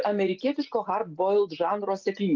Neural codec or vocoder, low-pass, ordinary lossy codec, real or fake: none; 7.2 kHz; Opus, 24 kbps; real